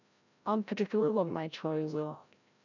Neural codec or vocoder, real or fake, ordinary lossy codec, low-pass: codec, 16 kHz, 0.5 kbps, FreqCodec, larger model; fake; none; 7.2 kHz